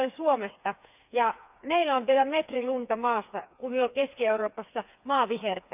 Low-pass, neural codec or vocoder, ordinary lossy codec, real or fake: 3.6 kHz; codec, 16 kHz, 4 kbps, FreqCodec, smaller model; none; fake